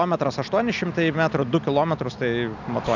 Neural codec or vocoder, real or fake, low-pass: none; real; 7.2 kHz